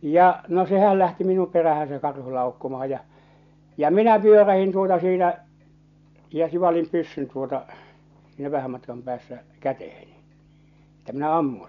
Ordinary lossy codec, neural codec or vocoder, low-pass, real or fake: none; none; 7.2 kHz; real